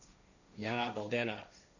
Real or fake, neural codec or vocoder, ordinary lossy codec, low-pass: fake; codec, 16 kHz, 1.1 kbps, Voila-Tokenizer; none; 7.2 kHz